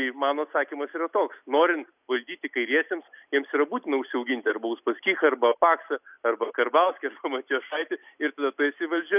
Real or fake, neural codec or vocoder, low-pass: real; none; 3.6 kHz